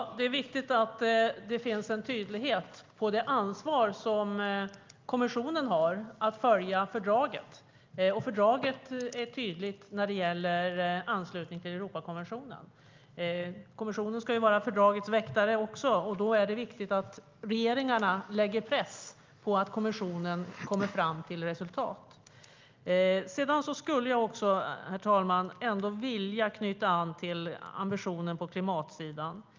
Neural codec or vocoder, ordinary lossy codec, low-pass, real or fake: none; Opus, 32 kbps; 7.2 kHz; real